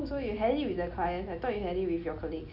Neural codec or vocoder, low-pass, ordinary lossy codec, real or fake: none; 5.4 kHz; none; real